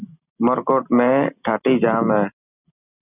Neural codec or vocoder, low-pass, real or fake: none; 3.6 kHz; real